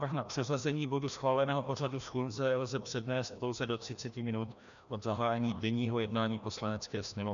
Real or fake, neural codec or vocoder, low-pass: fake; codec, 16 kHz, 1 kbps, FreqCodec, larger model; 7.2 kHz